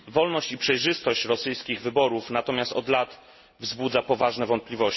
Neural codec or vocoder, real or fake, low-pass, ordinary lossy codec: none; real; 7.2 kHz; MP3, 24 kbps